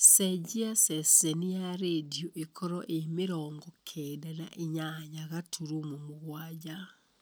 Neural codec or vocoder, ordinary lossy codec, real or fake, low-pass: none; none; real; none